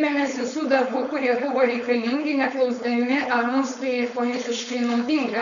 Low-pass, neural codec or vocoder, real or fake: 7.2 kHz; codec, 16 kHz, 4.8 kbps, FACodec; fake